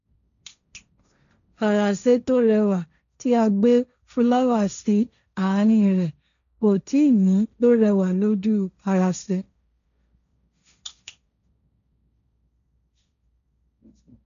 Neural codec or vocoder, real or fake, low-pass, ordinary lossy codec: codec, 16 kHz, 1.1 kbps, Voila-Tokenizer; fake; 7.2 kHz; none